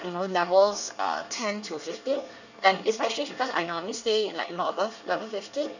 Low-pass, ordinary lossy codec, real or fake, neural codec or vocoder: 7.2 kHz; none; fake; codec, 24 kHz, 1 kbps, SNAC